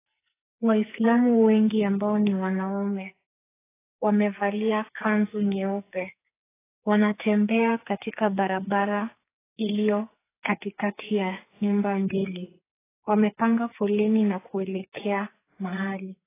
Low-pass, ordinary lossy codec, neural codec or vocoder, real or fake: 3.6 kHz; AAC, 16 kbps; codec, 44.1 kHz, 2.6 kbps, SNAC; fake